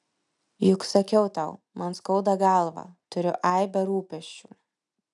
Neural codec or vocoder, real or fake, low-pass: none; real; 10.8 kHz